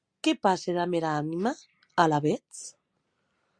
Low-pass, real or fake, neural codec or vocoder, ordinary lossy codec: 9.9 kHz; real; none; Opus, 64 kbps